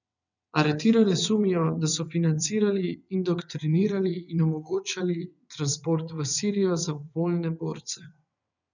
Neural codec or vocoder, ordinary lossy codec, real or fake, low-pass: vocoder, 22.05 kHz, 80 mel bands, WaveNeXt; none; fake; 7.2 kHz